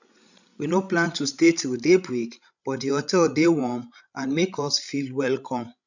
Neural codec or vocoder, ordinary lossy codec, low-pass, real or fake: codec, 16 kHz, 16 kbps, FreqCodec, larger model; none; 7.2 kHz; fake